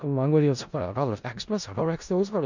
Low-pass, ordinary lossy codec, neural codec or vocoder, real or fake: 7.2 kHz; none; codec, 16 kHz in and 24 kHz out, 0.4 kbps, LongCat-Audio-Codec, four codebook decoder; fake